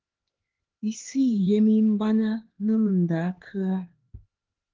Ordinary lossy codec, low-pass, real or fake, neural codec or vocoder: Opus, 16 kbps; 7.2 kHz; fake; codec, 16 kHz, 2 kbps, X-Codec, HuBERT features, trained on LibriSpeech